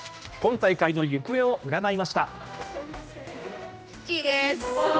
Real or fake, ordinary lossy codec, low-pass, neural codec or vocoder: fake; none; none; codec, 16 kHz, 1 kbps, X-Codec, HuBERT features, trained on general audio